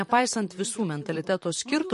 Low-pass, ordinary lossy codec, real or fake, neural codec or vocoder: 14.4 kHz; MP3, 48 kbps; fake; vocoder, 44.1 kHz, 128 mel bands, Pupu-Vocoder